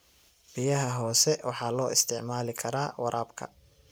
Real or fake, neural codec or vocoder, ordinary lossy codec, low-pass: real; none; none; none